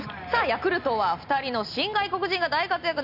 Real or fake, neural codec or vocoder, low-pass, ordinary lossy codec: real; none; 5.4 kHz; none